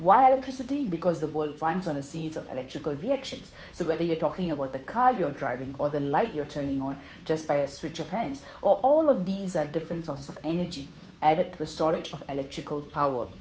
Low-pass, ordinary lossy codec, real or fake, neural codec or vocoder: none; none; fake; codec, 16 kHz, 2 kbps, FunCodec, trained on Chinese and English, 25 frames a second